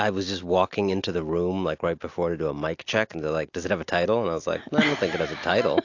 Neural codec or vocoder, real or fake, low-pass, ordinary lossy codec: none; real; 7.2 kHz; AAC, 48 kbps